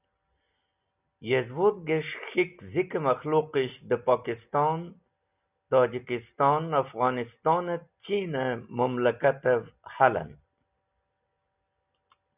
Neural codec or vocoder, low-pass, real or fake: none; 3.6 kHz; real